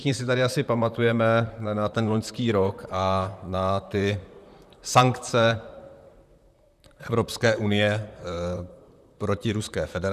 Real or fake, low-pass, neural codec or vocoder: fake; 14.4 kHz; vocoder, 44.1 kHz, 128 mel bands, Pupu-Vocoder